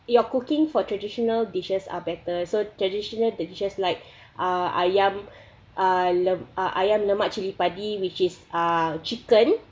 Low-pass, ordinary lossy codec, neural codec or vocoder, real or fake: none; none; none; real